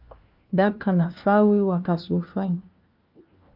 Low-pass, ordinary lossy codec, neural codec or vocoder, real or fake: 5.4 kHz; Opus, 24 kbps; codec, 16 kHz, 1 kbps, FunCodec, trained on LibriTTS, 50 frames a second; fake